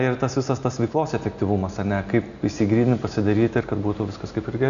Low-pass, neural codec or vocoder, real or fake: 7.2 kHz; none; real